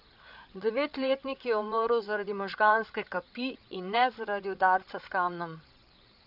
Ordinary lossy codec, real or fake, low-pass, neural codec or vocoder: none; fake; 5.4 kHz; codec, 16 kHz, 8 kbps, FreqCodec, larger model